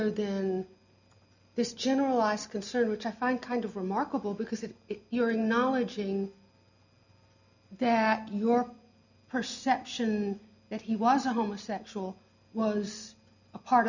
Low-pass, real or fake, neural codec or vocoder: 7.2 kHz; real; none